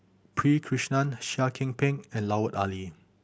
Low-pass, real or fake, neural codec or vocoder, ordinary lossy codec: none; real; none; none